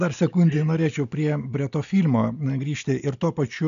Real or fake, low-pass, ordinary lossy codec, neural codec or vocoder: real; 7.2 kHz; AAC, 96 kbps; none